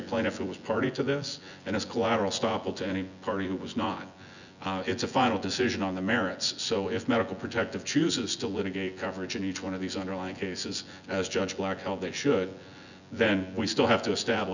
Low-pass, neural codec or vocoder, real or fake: 7.2 kHz; vocoder, 24 kHz, 100 mel bands, Vocos; fake